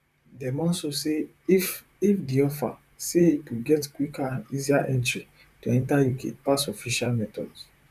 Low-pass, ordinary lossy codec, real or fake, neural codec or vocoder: 14.4 kHz; none; fake; vocoder, 44.1 kHz, 128 mel bands, Pupu-Vocoder